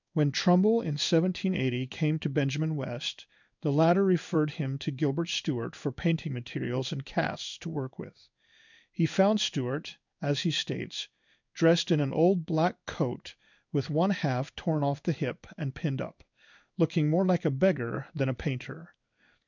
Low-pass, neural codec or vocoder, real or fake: 7.2 kHz; codec, 16 kHz in and 24 kHz out, 1 kbps, XY-Tokenizer; fake